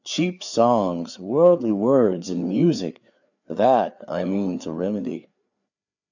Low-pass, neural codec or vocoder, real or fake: 7.2 kHz; codec, 16 kHz, 4 kbps, FreqCodec, larger model; fake